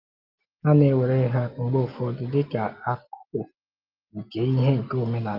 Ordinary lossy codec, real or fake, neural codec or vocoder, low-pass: Opus, 32 kbps; real; none; 5.4 kHz